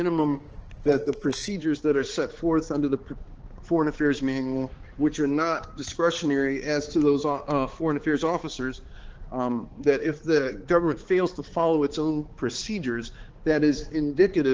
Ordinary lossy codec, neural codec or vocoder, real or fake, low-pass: Opus, 16 kbps; codec, 16 kHz, 4 kbps, X-Codec, HuBERT features, trained on balanced general audio; fake; 7.2 kHz